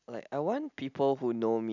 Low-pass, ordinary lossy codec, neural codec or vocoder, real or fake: 7.2 kHz; none; none; real